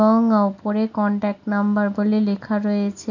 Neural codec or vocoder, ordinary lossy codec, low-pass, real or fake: none; none; 7.2 kHz; real